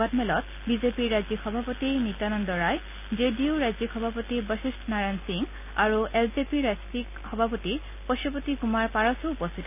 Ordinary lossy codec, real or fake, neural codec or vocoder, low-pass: none; real; none; 3.6 kHz